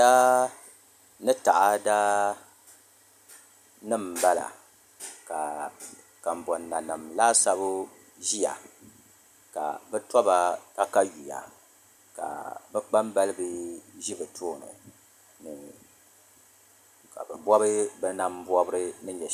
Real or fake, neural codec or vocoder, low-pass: real; none; 14.4 kHz